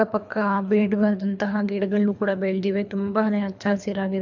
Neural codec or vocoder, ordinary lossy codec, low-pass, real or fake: codec, 24 kHz, 3 kbps, HILCodec; MP3, 64 kbps; 7.2 kHz; fake